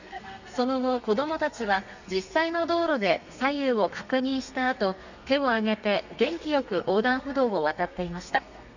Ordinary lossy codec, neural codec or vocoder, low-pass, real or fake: none; codec, 32 kHz, 1.9 kbps, SNAC; 7.2 kHz; fake